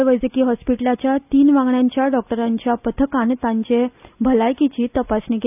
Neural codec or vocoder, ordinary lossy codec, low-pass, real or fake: none; none; 3.6 kHz; real